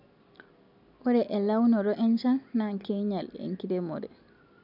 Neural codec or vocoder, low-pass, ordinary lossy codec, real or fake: none; 5.4 kHz; none; real